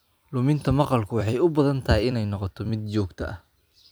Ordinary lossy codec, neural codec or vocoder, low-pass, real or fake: none; vocoder, 44.1 kHz, 128 mel bands every 256 samples, BigVGAN v2; none; fake